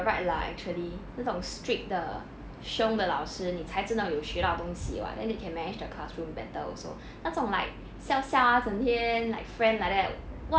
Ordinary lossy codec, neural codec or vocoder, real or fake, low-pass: none; none; real; none